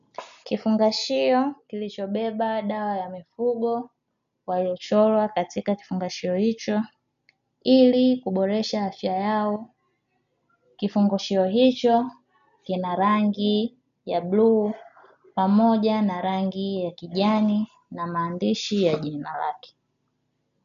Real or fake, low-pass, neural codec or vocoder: real; 7.2 kHz; none